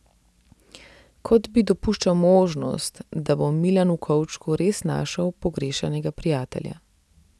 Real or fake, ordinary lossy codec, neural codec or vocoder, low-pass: real; none; none; none